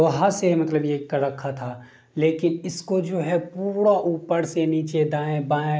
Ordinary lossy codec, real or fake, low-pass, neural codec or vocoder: none; real; none; none